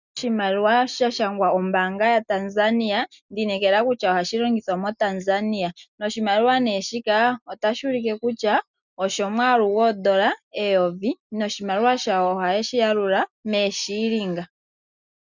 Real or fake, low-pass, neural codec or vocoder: real; 7.2 kHz; none